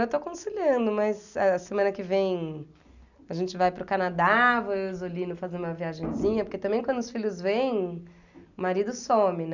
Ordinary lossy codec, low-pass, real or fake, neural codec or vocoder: none; 7.2 kHz; real; none